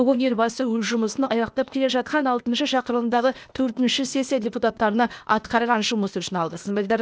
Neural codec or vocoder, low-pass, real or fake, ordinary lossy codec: codec, 16 kHz, 0.8 kbps, ZipCodec; none; fake; none